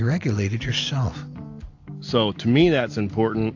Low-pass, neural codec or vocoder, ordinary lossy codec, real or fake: 7.2 kHz; none; AAC, 48 kbps; real